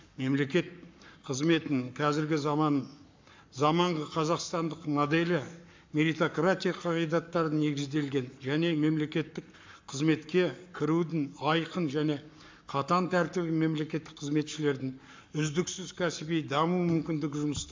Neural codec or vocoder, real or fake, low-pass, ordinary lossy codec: codec, 44.1 kHz, 7.8 kbps, Pupu-Codec; fake; 7.2 kHz; MP3, 64 kbps